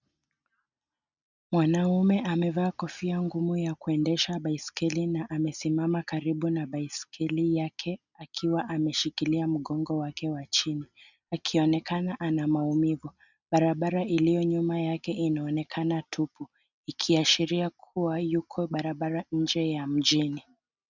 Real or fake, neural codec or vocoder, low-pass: real; none; 7.2 kHz